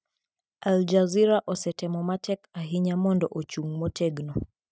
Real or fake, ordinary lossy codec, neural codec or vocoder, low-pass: real; none; none; none